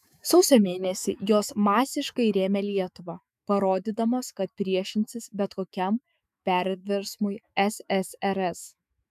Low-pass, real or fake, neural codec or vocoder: 14.4 kHz; fake; autoencoder, 48 kHz, 128 numbers a frame, DAC-VAE, trained on Japanese speech